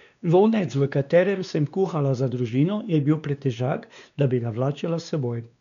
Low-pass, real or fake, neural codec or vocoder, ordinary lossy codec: 7.2 kHz; fake; codec, 16 kHz, 2 kbps, X-Codec, WavLM features, trained on Multilingual LibriSpeech; none